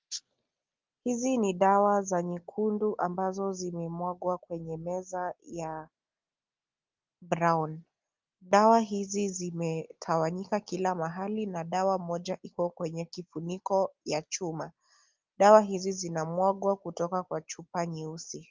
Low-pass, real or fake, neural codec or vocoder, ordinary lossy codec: 7.2 kHz; real; none; Opus, 32 kbps